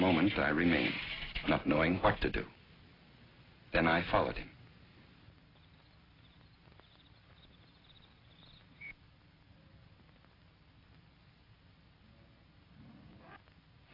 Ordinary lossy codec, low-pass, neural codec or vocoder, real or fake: AAC, 24 kbps; 5.4 kHz; none; real